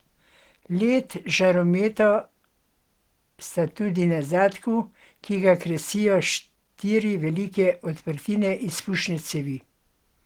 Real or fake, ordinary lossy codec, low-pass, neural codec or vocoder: real; Opus, 16 kbps; 19.8 kHz; none